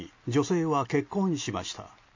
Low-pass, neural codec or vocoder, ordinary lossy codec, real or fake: 7.2 kHz; none; MP3, 32 kbps; real